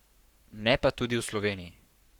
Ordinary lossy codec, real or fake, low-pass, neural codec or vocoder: Opus, 64 kbps; fake; 19.8 kHz; codec, 44.1 kHz, 7.8 kbps, Pupu-Codec